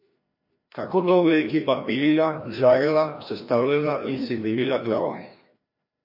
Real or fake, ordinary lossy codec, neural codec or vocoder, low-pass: fake; MP3, 32 kbps; codec, 16 kHz, 1 kbps, FreqCodec, larger model; 5.4 kHz